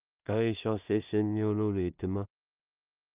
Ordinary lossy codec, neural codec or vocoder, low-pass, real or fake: Opus, 24 kbps; codec, 16 kHz in and 24 kHz out, 0.4 kbps, LongCat-Audio-Codec, two codebook decoder; 3.6 kHz; fake